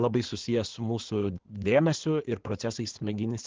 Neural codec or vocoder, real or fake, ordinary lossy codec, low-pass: codec, 16 kHz in and 24 kHz out, 2.2 kbps, FireRedTTS-2 codec; fake; Opus, 16 kbps; 7.2 kHz